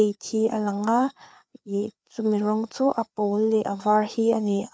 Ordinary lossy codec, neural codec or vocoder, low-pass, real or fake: none; codec, 16 kHz, 4 kbps, FreqCodec, larger model; none; fake